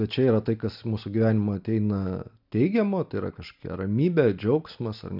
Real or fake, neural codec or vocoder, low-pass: real; none; 5.4 kHz